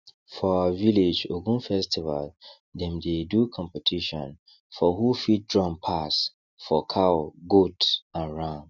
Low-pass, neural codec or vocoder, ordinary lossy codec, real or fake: 7.2 kHz; none; none; real